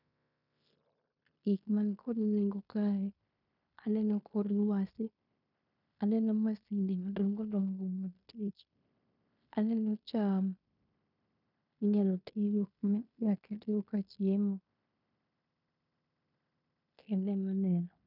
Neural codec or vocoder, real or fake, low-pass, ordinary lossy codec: codec, 16 kHz in and 24 kHz out, 0.9 kbps, LongCat-Audio-Codec, fine tuned four codebook decoder; fake; 5.4 kHz; none